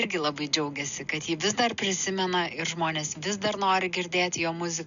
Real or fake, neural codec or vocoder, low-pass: real; none; 7.2 kHz